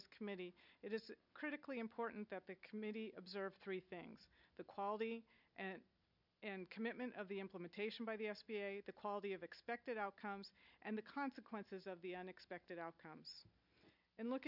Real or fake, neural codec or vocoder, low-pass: real; none; 5.4 kHz